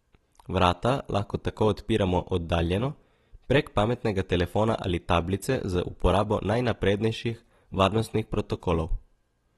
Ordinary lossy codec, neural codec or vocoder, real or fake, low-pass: AAC, 32 kbps; none; real; 19.8 kHz